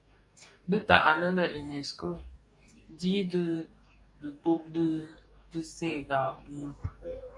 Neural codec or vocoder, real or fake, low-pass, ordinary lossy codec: codec, 44.1 kHz, 2.6 kbps, DAC; fake; 10.8 kHz; MP3, 96 kbps